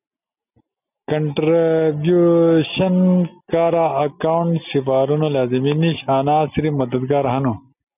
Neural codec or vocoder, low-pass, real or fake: none; 3.6 kHz; real